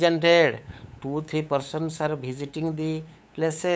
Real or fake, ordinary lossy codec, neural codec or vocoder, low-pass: fake; none; codec, 16 kHz, 8 kbps, FunCodec, trained on LibriTTS, 25 frames a second; none